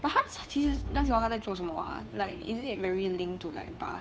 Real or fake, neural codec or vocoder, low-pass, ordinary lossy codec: fake; codec, 16 kHz, 2 kbps, FunCodec, trained on Chinese and English, 25 frames a second; none; none